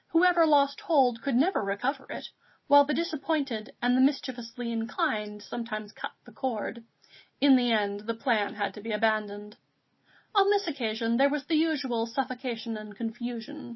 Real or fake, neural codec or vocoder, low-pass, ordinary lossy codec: real; none; 7.2 kHz; MP3, 24 kbps